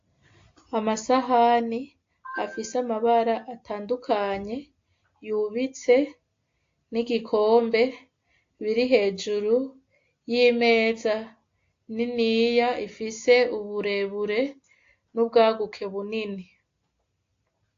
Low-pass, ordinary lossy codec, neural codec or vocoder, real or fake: 7.2 kHz; AAC, 48 kbps; none; real